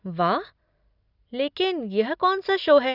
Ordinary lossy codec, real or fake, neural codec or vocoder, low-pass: Opus, 64 kbps; real; none; 5.4 kHz